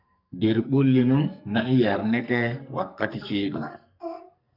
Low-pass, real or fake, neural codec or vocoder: 5.4 kHz; fake; codec, 44.1 kHz, 2.6 kbps, SNAC